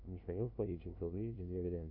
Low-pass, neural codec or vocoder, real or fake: 5.4 kHz; codec, 16 kHz, 0.5 kbps, FunCodec, trained on LibriTTS, 25 frames a second; fake